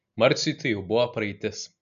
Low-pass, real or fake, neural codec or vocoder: 7.2 kHz; real; none